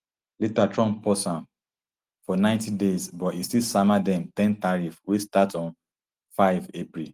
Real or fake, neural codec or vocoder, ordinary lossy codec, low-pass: real; none; Opus, 24 kbps; 14.4 kHz